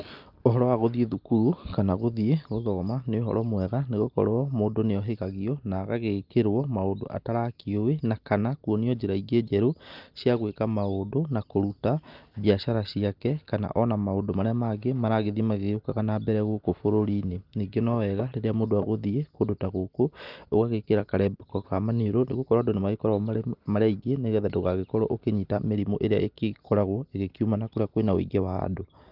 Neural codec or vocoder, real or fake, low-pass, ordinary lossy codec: none; real; 5.4 kHz; Opus, 32 kbps